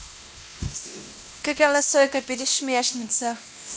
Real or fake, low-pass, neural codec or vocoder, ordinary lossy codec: fake; none; codec, 16 kHz, 1 kbps, X-Codec, WavLM features, trained on Multilingual LibriSpeech; none